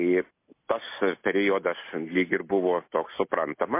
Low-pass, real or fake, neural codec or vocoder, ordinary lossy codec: 3.6 kHz; real; none; MP3, 24 kbps